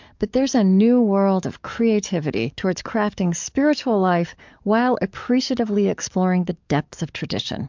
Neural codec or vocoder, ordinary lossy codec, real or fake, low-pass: codec, 44.1 kHz, 7.8 kbps, DAC; MP3, 64 kbps; fake; 7.2 kHz